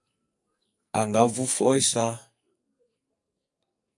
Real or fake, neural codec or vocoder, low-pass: fake; codec, 44.1 kHz, 2.6 kbps, SNAC; 10.8 kHz